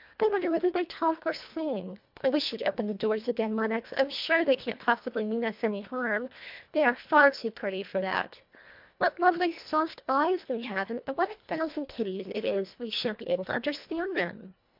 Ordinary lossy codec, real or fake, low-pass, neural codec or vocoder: MP3, 48 kbps; fake; 5.4 kHz; codec, 24 kHz, 1.5 kbps, HILCodec